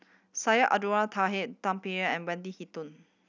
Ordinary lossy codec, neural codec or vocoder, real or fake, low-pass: none; none; real; 7.2 kHz